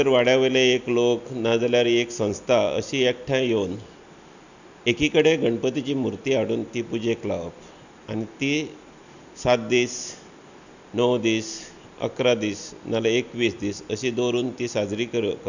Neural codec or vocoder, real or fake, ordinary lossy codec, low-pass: none; real; none; 7.2 kHz